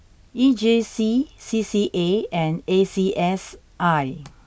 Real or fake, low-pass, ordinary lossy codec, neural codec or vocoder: real; none; none; none